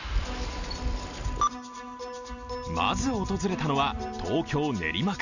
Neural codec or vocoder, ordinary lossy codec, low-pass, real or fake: none; none; 7.2 kHz; real